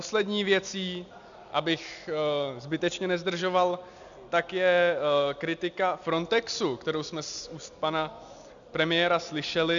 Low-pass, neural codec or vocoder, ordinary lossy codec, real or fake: 7.2 kHz; none; MP3, 96 kbps; real